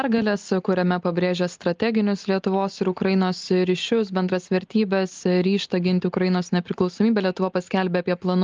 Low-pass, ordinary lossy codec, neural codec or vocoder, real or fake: 7.2 kHz; Opus, 32 kbps; none; real